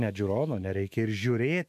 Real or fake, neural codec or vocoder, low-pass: fake; autoencoder, 48 kHz, 128 numbers a frame, DAC-VAE, trained on Japanese speech; 14.4 kHz